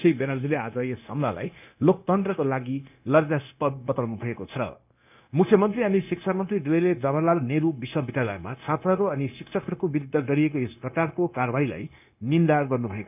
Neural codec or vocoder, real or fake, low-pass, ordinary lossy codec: codec, 16 kHz, 0.9 kbps, LongCat-Audio-Codec; fake; 3.6 kHz; none